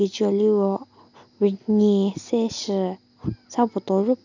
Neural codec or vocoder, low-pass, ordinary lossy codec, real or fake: none; 7.2 kHz; none; real